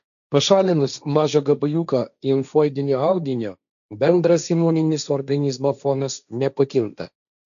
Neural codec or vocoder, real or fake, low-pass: codec, 16 kHz, 1.1 kbps, Voila-Tokenizer; fake; 7.2 kHz